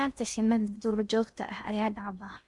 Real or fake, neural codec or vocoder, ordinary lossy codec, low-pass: fake; codec, 16 kHz in and 24 kHz out, 0.6 kbps, FocalCodec, streaming, 2048 codes; none; 10.8 kHz